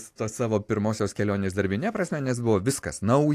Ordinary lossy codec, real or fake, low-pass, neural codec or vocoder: AAC, 64 kbps; real; 14.4 kHz; none